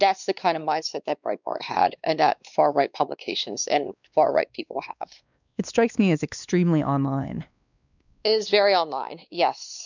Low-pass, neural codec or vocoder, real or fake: 7.2 kHz; codec, 16 kHz, 2 kbps, X-Codec, WavLM features, trained on Multilingual LibriSpeech; fake